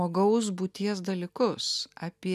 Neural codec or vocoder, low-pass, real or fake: none; 14.4 kHz; real